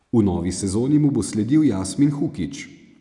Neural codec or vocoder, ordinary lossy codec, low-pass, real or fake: none; none; 10.8 kHz; real